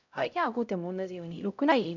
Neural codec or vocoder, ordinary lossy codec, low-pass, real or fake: codec, 16 kHz, 0.5 kbps, X-Codec, HuBERT features, trained on LibriSpeech; none; 7.2 kHz; fake